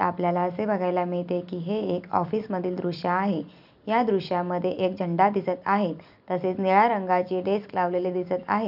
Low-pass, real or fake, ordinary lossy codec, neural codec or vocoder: 5.4 kHz; fake; none; vocoder, 44.1 kHz, 128 mel bands every 256 samples, BigVGAN v2